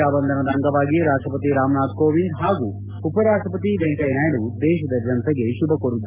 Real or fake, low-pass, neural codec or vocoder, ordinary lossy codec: real; 3.6 kHz; none; Opus, 24 kbps